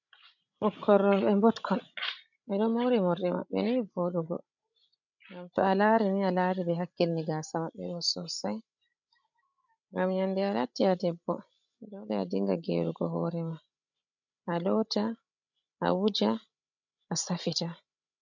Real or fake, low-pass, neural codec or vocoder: fake; 7.2 kHz; codec, 16 kHz, 16 kbps, FreqCodec, larger model